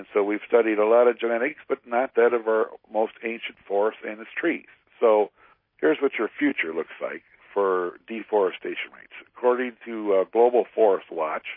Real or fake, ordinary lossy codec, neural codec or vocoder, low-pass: real; MP3, 24 kbps; none; 5.4 kHz